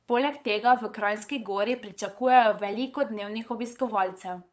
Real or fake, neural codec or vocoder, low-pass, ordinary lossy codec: fake; codec, 16 kHz, 8 kbps, FunCodec, trained on LibriTTS, 25 frames a second; none; none